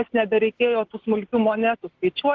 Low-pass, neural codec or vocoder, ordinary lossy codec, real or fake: 7.2 kHz; none; Opus, 16 kbps; real